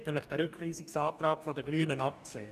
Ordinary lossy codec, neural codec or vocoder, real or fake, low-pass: none; codec, 44.1 kHz, 2.6 kbps, DAC; fake; 14.4 kHz